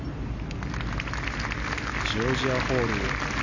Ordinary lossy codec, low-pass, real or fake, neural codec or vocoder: none; 7.2 kHz; real; none